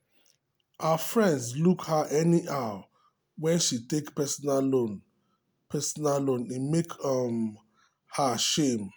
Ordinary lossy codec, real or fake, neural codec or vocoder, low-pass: none; real; none; none